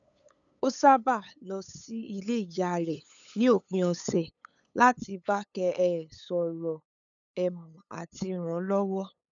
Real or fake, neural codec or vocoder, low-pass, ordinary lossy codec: fake; codec, 16 kHz, 8 kbps, FunCodec, trained on LibriTTS, 25 frames a second; 7.2 kHz; none